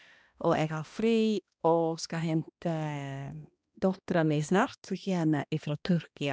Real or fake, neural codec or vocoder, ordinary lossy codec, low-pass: fake; codec, 16 kHz, 1 kbps, X-Codec, HuBERT features, trained on balanced general audio; none; none